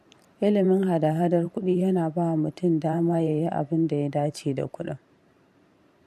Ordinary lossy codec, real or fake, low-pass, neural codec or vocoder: MP3, 64 kbps; fake; 14.4 kHz; vocoder, 44.1 kHz, 128 mel bands every 512 samples, BigVGAN v2